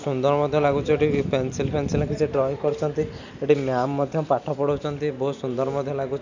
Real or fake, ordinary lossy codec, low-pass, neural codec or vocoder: real; none; 7.2 kHz; none